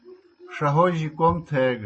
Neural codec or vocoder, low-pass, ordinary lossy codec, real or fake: none; 9.9 kHz; MP3, 32 kbps; real